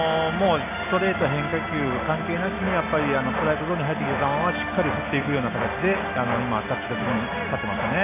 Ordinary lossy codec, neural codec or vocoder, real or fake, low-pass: AAC, 24 kbps; none; real; 3.6 kHz